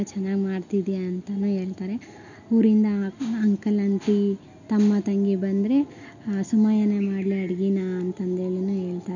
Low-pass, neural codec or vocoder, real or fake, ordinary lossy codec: 7.2 kHz; none; real; none